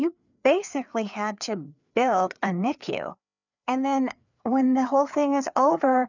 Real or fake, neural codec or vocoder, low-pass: fake; codec, 16 kHz, 4 kbps, FreqCodec, larger model; 7.2 kHz